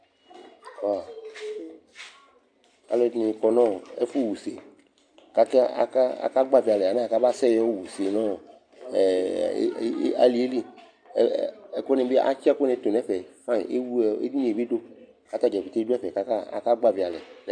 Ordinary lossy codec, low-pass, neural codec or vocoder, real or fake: AAC, 64 kbps; 9.9 kHz; none; real